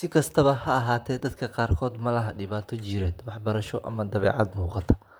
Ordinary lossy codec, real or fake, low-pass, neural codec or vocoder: none; fake; none; vocoder, 44.1 kHz, 128 mel bands, Pupu-Vocoder